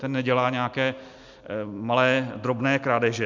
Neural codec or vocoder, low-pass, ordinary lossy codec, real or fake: none; 7.2 kHz; MP3, 64 kbps; real